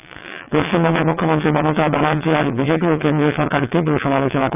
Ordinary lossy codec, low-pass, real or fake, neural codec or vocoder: none; 3.6 kHz; fake; vocoder, 22.05 kHz, 80 mel bands, WaveNeXt